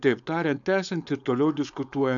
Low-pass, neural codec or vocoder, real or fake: 7.2 kHz; codec, 16 kHz, 4.8 kbps, FACodec; fake